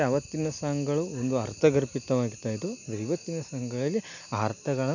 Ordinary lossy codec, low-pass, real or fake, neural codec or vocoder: none; 7.2 kHz; real; none